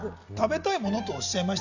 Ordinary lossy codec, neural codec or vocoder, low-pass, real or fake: none; none; 7.2 kHz; real